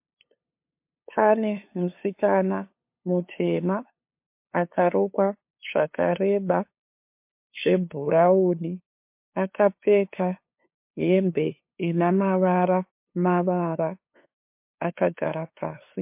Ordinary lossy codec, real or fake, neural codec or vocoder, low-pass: MP3, 32 kbps; fake; codec, 16 kHz, 2 kbps, FunCodec, trained on LibriTTS, 25 frames a second; 3.6 kHz